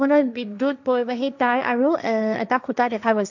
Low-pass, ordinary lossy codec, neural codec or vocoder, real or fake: 7.2 kHz; none; codec, 16 kHz, 1.1 kbps, Voila-Tokenizer; fake